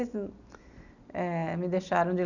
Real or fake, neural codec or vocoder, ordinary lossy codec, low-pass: real; none; none; 7.2 kHz